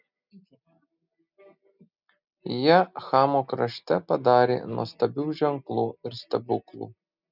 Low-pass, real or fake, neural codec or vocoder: 5.4 kHz; real; none